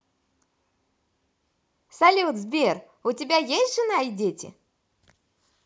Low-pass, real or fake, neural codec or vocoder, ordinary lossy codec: none; real; none; none